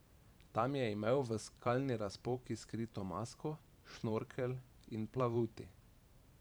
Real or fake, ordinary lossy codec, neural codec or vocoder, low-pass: fake; none; vocoder, 44.1 kHz, 128 mel bands every 512 samples, BigVGAN v2; none